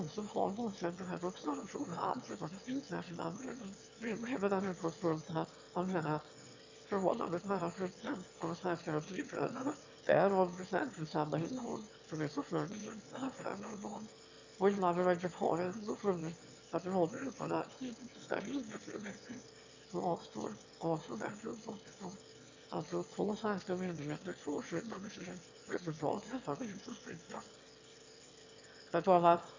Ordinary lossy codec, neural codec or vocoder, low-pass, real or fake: MP3, 64 kbps; autoencoder, 22.05 kHz, a latent of 192 numbers a frame, VITS, trained on one speaker; 7.2 kHz; fake